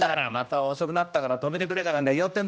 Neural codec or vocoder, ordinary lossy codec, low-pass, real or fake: codec, 16 kHz, 1 kbps, X-Codec, HuBERT features, trained on general audio; none; none; fake